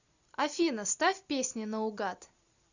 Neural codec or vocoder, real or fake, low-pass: none; real; 7.2 kHz